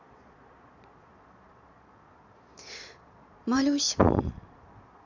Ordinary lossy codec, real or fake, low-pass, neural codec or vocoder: none; fake; 7.2 kHz; vocoder, 22.05 kHz, 80 mel bands, Vocos